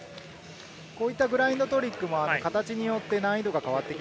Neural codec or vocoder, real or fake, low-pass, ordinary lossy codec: none; real; none; none